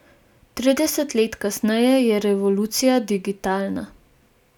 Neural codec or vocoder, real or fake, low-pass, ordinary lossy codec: none; real; 19.8 kHz; none